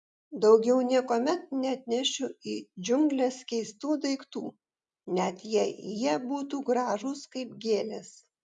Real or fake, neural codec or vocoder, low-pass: real; none; 10.8 kHz